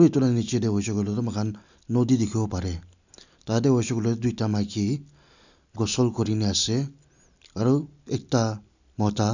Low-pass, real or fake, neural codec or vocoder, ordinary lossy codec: 7.2 kHz; real; none; none